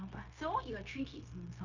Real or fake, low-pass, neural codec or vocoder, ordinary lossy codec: fake; 7.2 kHz; codec, 16 kHz, 0.9 kbps, LongCat-Audio-Codec; none